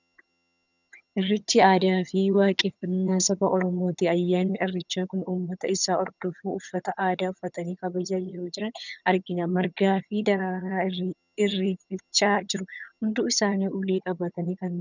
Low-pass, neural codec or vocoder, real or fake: 7.2 kHz; vocoder, 22.05 kHz, 80 mel bands, HiFi-GAN; fake